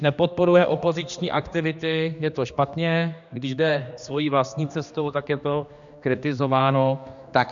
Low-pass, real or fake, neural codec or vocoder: 7.2 kHz; fake; codec, 16 kHz, 2 kbps, X-Codec, HuBERT features, trained on general audio